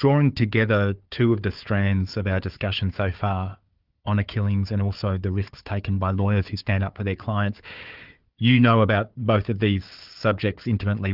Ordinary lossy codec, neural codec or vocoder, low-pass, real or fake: Opus, 24 kbps; codec, 16 kHz, 4 kbps, FunCodec, trained on Chinese and English, 50 frames a second; 5.4 kHz; fake